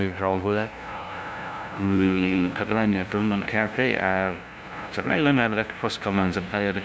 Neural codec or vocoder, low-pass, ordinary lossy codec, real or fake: codec, 16 kHz, 0.5 kbps, FunCodec, trained on LibriTTS, 25 frames a second; none; none; fake